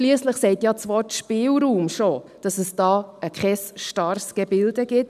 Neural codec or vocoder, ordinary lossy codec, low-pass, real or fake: none; none; 14.4 kHz; real